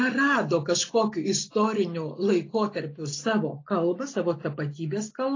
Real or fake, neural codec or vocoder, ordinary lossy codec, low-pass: real; none; AAC, 32 kbps; 7.2 kHz